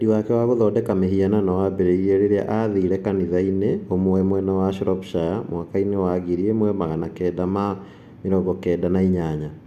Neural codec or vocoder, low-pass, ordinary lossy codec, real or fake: none; 14.4 kHz; none; real